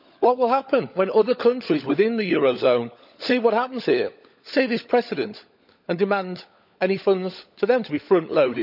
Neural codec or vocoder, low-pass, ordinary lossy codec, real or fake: codec, 16 kHz, 16 kbps, FunCodec, trained on LibriTTS, 50 frames a second; 5.4 kHz; none; fake